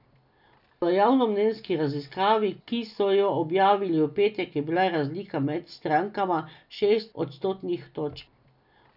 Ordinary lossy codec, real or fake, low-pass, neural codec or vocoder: none; real; 5.4 kHz; none